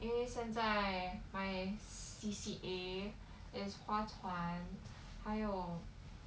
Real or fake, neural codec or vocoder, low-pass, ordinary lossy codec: real; none; none; none